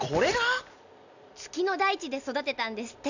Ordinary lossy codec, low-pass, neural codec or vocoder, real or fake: none; 7.2 kHz; none; real